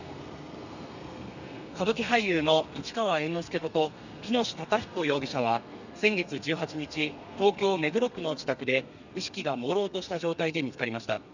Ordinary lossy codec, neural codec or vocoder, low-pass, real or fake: none; codec, 32 kHz, 1.9 kbps, SNAC; 7.2 kHz; fake